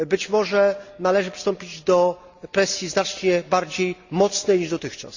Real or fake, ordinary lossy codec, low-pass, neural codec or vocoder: real; Opus, 64 kbps; 7.2 kHz; none